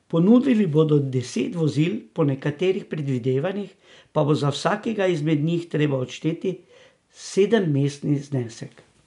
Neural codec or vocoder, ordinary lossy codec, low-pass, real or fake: none; none; 10.8 kHz; real